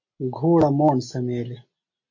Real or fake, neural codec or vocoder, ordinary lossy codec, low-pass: real; none; MP3, 32 kbps; 7.2 kHz